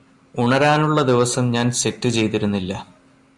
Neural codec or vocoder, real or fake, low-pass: none; real; 10.8 kHz